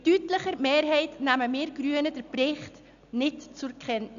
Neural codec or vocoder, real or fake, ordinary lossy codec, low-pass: none; real; none; 7.2 kHz